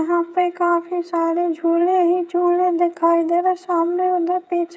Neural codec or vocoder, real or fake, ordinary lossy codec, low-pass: codec, 16 kHz, 8 kbps, FreqCodec, smaller model; fake; none; none